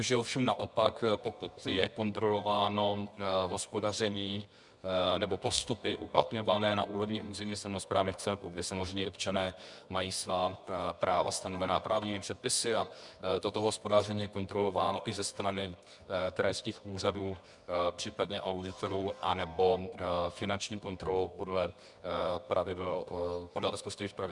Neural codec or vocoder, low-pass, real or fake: codec, 24 kHz, 0.9 kbps, WavTokenizer, medium music audio release; 10.8 kHz; fake